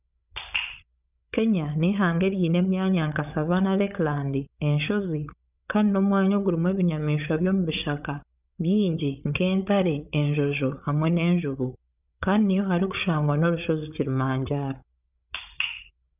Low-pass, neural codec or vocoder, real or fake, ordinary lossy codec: 3.6 kHz; codec, 16 kHz, 8 kbps, FreqCodec, larger model; fake; none